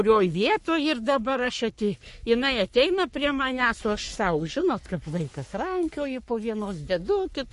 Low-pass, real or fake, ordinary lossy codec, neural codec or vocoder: 14.4 kHz; fake; MP3, 48 kbps; codec, 44.1 kHz, 3.4 kbps, Pupu-Codec